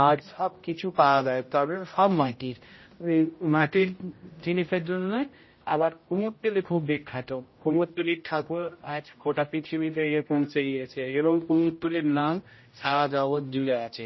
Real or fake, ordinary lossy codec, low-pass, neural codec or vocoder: fake; MP3, 24 kbps; 7.2 kHz; codec, 16 kHz, 0.5 kbps, X-Codec, HuBERT features, trained on general audio